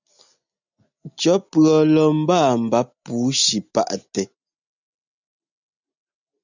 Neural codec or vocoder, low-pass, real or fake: none; 7.2 kHz; real